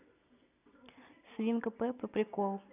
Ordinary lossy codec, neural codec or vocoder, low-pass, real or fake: none; none; 3.6 kHz; real